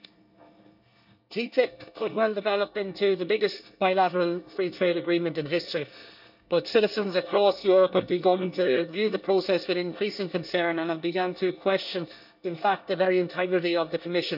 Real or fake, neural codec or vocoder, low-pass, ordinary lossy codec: fake; codec, 24 kHz, 1 kbps, SNAC; 5.4 kHz; none